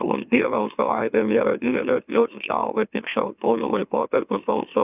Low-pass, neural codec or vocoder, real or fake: 3.6 kHz; autoencoder, 44.1 kHz, a latent of 192 numbers a frame, MeloTTS; fake